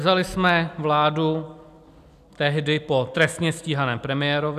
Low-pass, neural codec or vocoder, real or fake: 14.4 kHz; none; real